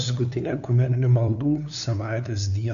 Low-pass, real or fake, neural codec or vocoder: 7.2 kHz; fake; codec, 16 kHz, 2 kbps, FunCodec, trained on LibriTTS, 25 frames a second